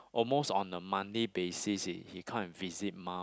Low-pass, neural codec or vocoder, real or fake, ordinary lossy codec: none; none; real; none